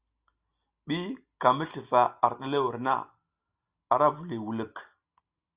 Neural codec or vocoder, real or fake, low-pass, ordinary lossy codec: none; real; 3.6 kHz; Opus, 64 kbps